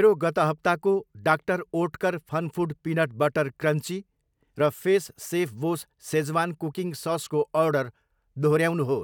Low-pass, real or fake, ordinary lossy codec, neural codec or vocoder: 19.8 kHz; real; none; none